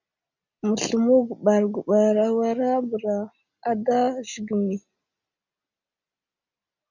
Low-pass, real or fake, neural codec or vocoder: 7.2 kHz; real; none